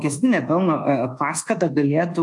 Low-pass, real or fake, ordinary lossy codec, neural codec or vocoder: 10.8 kHz; fake; AAC, 64 kbps; codec, 24 kHz, 1.2 kbps, DualCodec